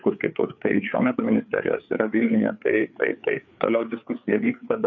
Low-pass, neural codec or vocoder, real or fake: 7.2 kHz; codec, 16 kHz, 4 kbps, FreqCodec, larger model; fake